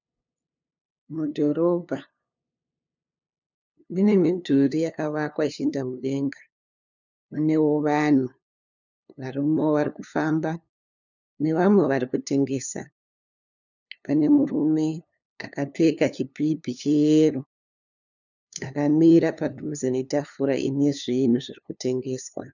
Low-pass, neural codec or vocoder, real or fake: 7.2 kHz; codec, 16 kHz, 2 kbps, FunCodec, trained on LibriTTS, 25 frames a second; fake